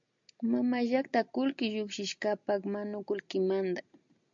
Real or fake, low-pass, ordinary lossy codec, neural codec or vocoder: real; 7.2 kHz; MP3, 48 kbps; none